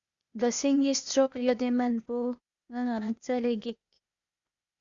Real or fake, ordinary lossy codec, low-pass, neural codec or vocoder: fake; Opus, 64 kbps; 7.2 kHz; codec, 16 kHz, 0.8 kbps, ZipCodec